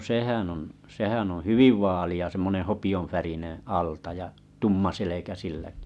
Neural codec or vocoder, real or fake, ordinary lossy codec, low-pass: none; real; none; none